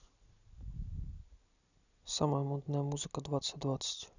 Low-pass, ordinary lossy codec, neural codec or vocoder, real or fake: 7.2 kHz; none; none; real